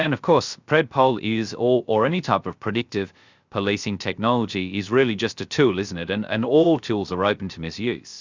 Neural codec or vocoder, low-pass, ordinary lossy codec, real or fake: codec, 16 kHz, 0.3 kbps, FocalCodec; 7.2 kHz; Opus, 64 kbps; fake